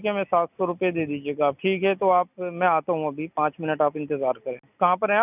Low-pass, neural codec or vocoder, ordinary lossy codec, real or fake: 3.6 kHz; none; none; real